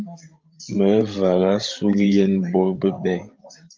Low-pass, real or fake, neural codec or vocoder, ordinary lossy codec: 7.2 kHz; fake; codec, 16 kHz, 16 kbps, FreqCodec, larger model; Opus, 24 kbps